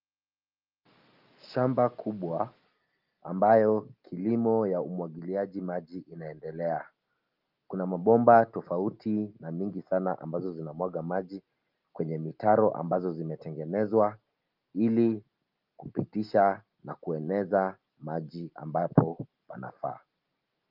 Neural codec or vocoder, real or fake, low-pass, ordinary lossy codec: none; real; 5.4 kHz; Opus, 32 kbps